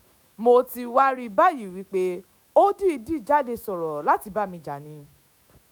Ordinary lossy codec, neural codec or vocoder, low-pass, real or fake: none; autoencoder, 48 kHz, 128 numbers a frame, DAC-VAE, trained on Japanese speech; none; fake